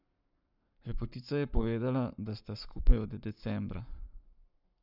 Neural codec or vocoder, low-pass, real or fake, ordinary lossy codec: codec, 44.1 kHz, 7.8 kbps, Pupu-Codec; 5.4 kHz; fake; none